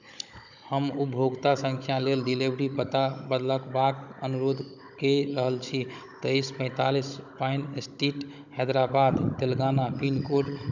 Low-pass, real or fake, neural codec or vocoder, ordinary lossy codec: 7.2 kHz; fake; codec, 16 kHz, 16 kbps, FunCodec, trained on Chinese and English, 50 frames a second; none